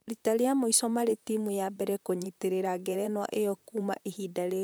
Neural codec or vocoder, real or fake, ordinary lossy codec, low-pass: vocoder, 44.1 kHz, 128 mel bands, Pupu-Vocoder; fake; none; none